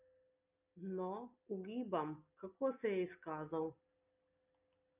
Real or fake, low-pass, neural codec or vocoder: real; 3.6 kHz; none